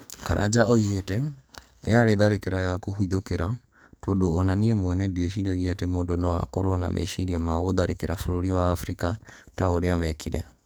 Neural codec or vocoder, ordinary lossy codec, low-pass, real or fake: codec, 44.1 kHz, 2.6 kbps, SNAC; none; none; fake